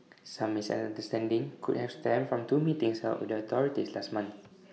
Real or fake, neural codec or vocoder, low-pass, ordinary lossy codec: real; none; none; none